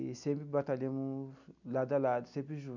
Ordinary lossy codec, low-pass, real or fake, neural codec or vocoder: none; 7.2 kHz; real; none